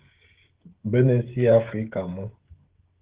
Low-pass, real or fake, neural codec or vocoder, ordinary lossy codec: 3.6 kHz; fake; codec, 16 kHz, 16 kbps, FreqCodec, smaller model; Opus, 16 kbps